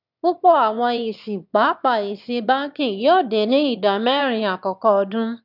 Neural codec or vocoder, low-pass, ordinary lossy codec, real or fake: autoencoder, 22.05 kHz, a latent of 192 numbers a frame, VITS, trained on one speaker; 5.4 kHz; none; fake